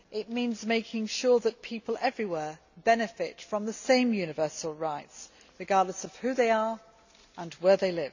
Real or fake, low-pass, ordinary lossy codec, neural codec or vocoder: real; 7.2 kHz; none; none